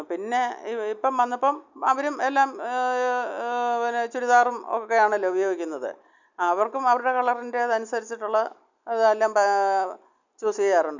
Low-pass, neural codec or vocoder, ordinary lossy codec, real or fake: 7.2 kHz; none; none; real